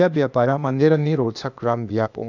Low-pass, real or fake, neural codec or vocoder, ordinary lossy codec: 7.2 kHz; fake; codec, 16 kHz, 0.8 kbps, ZipCodec; none